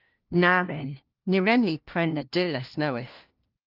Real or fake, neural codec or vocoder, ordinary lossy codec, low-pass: fake; codec, 16 kHz, 1 kbps, FunCodec, trained on LibriTTS, 50 frames a second; Opus, 24 kbps; 5.4 kHz